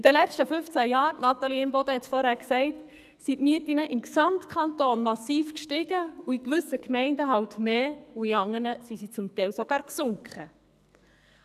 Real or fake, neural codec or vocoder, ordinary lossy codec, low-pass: fake; codec, 44.1 kHz, 2.6 kbps, SNAC; none; 14.4 kHz